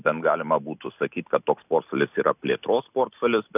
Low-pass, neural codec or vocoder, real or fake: 3.6 kHz; none; real